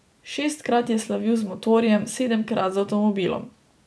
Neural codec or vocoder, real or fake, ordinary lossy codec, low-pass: none; real; none; none